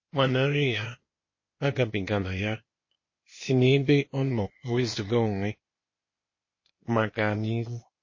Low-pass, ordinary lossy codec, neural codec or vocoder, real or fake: 7.2 kHz; MP3, 32 kbps; codec, 16 kHz, 0.8 kbps, ZipCodec; fake